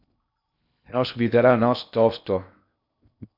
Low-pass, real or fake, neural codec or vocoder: 5.4 kHz; fake; codec, 16 kHz in and 24 kHz out, 0.6 kbps, FocalCodec, streaming, 2048 codes